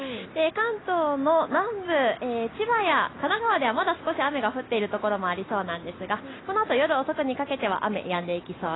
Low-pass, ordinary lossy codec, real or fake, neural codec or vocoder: 7.2 kHz; AAC, 16 kbps; real; none